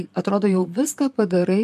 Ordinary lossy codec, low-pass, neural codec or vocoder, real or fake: MP3, 96 kbps; 14.4 kHz; vocoder, 44.1 kHz, 128 mel bands, Pupu-Vocoder; fake